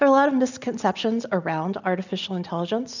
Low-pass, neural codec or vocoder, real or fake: 7.2 kHz; none; real